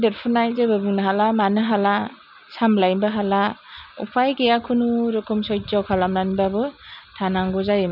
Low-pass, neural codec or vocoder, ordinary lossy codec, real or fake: 5.4 kHz; none; none; real